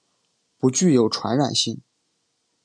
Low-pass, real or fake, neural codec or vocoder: 9.9 kHz; real; none